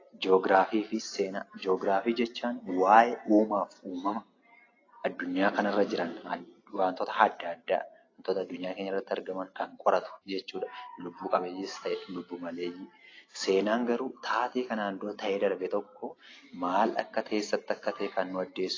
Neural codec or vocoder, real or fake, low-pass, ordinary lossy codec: none; real; 7.2 kHz; AAC, 32 kbps